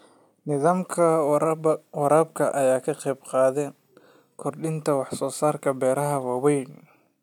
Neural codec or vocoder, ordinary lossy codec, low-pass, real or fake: none; none; 19.8 kHz; real